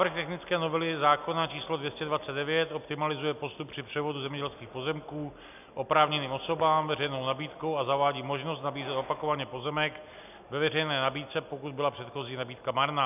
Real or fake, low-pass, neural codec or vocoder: real; 3.6 kHz; none